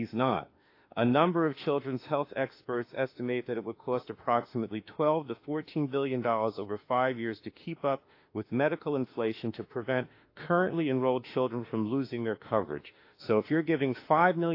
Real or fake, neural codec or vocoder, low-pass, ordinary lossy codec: fake; autoencoder, 48 kHz, 32 numbers a frame, DAC-VAE, trained on Japanese speech; 5.4 kHz; AAC, 32 kbps